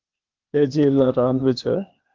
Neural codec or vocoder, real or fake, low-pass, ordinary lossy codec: codec, 16 kHz, 0.8 kbps, ZipCodec; fake; 7.2 kHz; Opus, 32 kbps